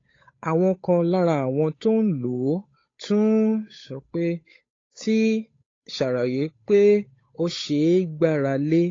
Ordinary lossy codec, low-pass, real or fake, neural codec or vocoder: AAC, 32 kbps; 7.2 kHz; fake; codec, 16 kHz, 8 kbps, FunCodec, trained on Chinese and English, 25 frames a second